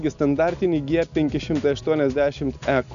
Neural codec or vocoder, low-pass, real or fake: none; 7.2 kHz; real